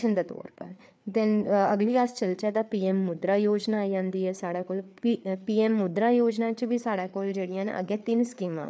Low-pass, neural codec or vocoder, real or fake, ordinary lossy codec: none; codec, 16 kHz, 4 kbps, FreqCodec, larger model; fake; none